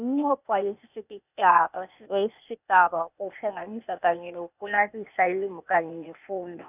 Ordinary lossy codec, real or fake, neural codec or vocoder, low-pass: none; fake; codec, 16 kHz, 0.8 kbps, ZipCodec; 3.6 kHz